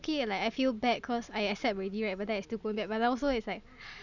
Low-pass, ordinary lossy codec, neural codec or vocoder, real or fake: 7.2 kHz; none; none; real